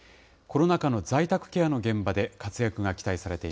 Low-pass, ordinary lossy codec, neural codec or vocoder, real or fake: none; none; none; real